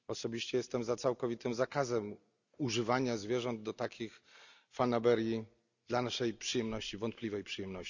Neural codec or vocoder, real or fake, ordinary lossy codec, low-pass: none; real; none; 7.2 kHz